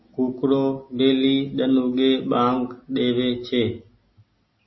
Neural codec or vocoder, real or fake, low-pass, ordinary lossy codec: none; real; 7.2 kHz; MP3, 24 kbps